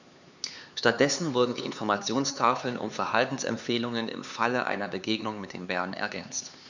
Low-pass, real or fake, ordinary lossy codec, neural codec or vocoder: 7.2 kHz; fake; none; codec, 16 kHz, 4 kbps, X-Codec, HuBERT features, trained on LibriSpeech